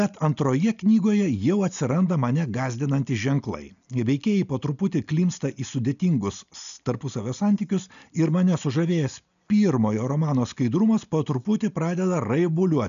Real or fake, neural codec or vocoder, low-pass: real; none; 7.2 kHz